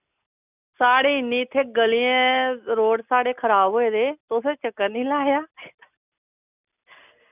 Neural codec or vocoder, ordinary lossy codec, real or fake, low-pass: none; none; real; 3.6 kHz